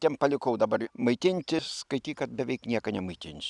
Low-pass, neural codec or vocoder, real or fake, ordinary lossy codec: 10.8 kHz; none; real; Opus, 64 kbps